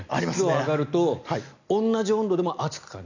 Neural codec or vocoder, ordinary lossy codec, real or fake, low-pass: none; none; real; 7.2 kHz